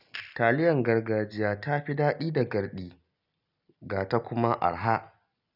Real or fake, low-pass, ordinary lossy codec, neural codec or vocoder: real; 5.4 kHz; none; none